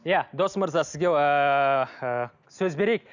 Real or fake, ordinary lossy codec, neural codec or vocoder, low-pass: real; none; none; 7.2 kHz